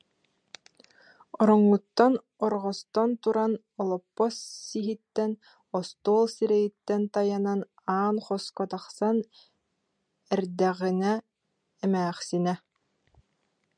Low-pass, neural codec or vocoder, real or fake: 9.9 kHz; none; real